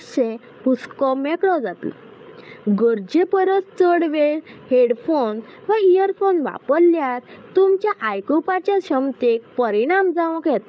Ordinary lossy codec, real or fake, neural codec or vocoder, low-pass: none; fake; codec, 16 kHz, 8 kbps, FreqCodec, larger model; none